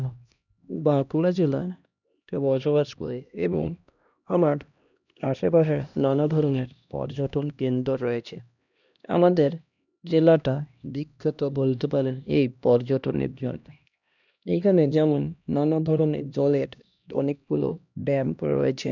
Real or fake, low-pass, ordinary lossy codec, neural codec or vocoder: fake; 7.2 kHz; none; codec, 16 kHz, 1 kbps, X-Codec, HuBERT features, trained on LibriSpeech